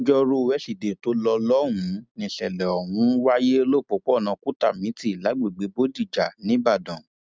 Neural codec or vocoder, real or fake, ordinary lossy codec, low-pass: none; real; none; none